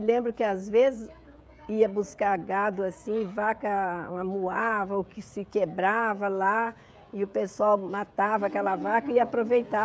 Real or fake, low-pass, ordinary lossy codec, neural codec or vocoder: fake; none; none; codec, 16 kHz, 16 kbps, FreqCodec, smaller model